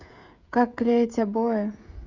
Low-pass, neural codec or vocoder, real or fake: 7.2 kHz; codec, 16 kHz, 16 kbps, FreqCodec, smaller model; fake